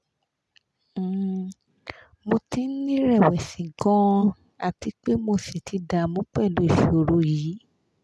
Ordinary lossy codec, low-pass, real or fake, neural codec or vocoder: none; none; real; none